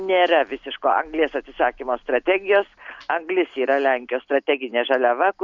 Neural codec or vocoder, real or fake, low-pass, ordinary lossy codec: none; real; 7.2 kHz; Opus, 64 kbps